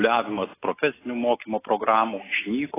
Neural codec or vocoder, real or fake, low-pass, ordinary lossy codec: none; real; 3.6 kHz; AAC, 16 kbps